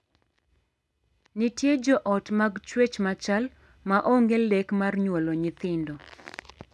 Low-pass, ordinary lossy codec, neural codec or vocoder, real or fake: none; none; none; real